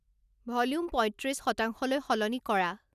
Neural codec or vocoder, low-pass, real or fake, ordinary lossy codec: none; 14.4 kHz; real; none